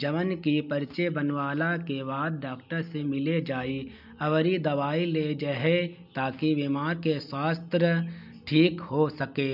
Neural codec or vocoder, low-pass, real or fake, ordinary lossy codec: none; 5.4 kHz; real; none